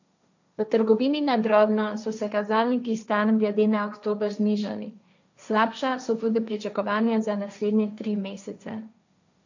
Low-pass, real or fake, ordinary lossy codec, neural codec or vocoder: 7.2 kHz; fake; none; codec, 16 kHz, 1.1 kbps, Voila-Tokenizer